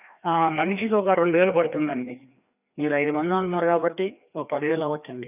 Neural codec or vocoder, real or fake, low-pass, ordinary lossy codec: codec, 16 kHz, 2 kbps, FreqCodec, larger model; fake; 3.6 kHz; none